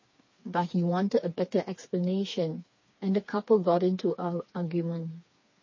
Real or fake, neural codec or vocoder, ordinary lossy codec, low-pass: fake; codec, 16 kHz, 4 kbps, FreqCodec, smaller model; MP3, 32 kbps; 7.2 kHz